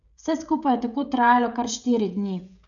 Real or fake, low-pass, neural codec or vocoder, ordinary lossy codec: fake; 7.2 kHz; codec, 16 kHz, 16 kbps, FreqCodec, smaller model; none